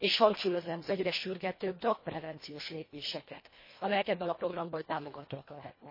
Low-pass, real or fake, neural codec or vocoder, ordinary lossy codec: 5.4 kHz; fake; codec, 24 kHz, 1.5 kbps, HILCodec; MP3, 24 kbps